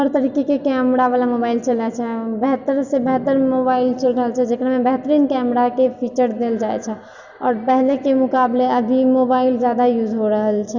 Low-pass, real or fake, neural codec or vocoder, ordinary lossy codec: 7.2 kHz; real; none; Opus, 64 kbps